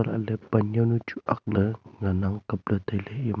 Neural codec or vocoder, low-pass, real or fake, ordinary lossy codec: none; none; real; none